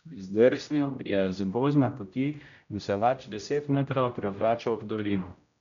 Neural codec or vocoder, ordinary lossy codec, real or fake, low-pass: codec, 16 kHz, 0.5 kbps, X-Codec, HuBERT features, trained on general audio; none; fake; 7.2 kHz